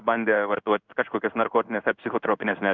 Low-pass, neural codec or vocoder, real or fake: 7.2 kHz; codec, 16 kHz in and 24 kHz out, 1 kbps, XY-Tokenizer; fake